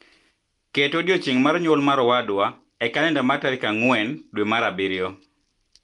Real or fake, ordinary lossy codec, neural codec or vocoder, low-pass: real; Opus, 32 kbps; none; 10.8 kHz